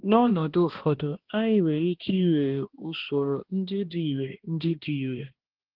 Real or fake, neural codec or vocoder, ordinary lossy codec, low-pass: fake; codec, 16 kHz, 1 kbps, X-Codec, HuBERT features, trained on balanced general audio; Opus, 16 kbps; 5.4 kHz